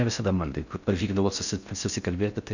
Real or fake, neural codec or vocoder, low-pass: fake; codec, 16 kHz in and 24 kHz out, 0.6 kbps, FocalCodec, streaming, 4096 codes; 7.2 kHz